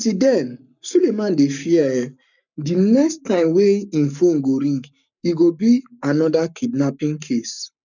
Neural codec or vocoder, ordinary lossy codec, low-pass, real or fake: codec, 44.1 kHz, 7.8 kbps, Pupu-Codec; none; 7.2 kHz; fake